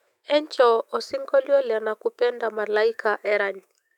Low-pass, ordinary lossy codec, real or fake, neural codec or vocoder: 19.8 kHz; none; fake; autoencoder, 48 kHz, 128 numbers a frame, DAC-VAE, trained on Japanese speech